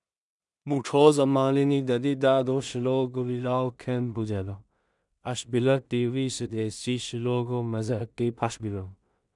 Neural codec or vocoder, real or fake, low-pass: codec, 16 kHz in and 24 kHz out, 0.4 kbps, LongCat-Audio-Codec, two codebook decoder; fake; 10.8 kHz